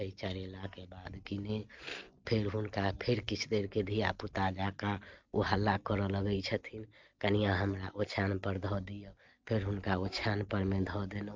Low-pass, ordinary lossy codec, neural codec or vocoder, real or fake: 7.2 kHz; Opus, 24 kbps; none; real